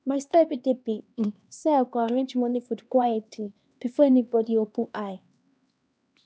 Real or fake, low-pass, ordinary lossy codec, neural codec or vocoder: fake; none; none; codec, 16 kHz, 2 kbps, X-Codec, HuBERT features, trained on LibriSpeech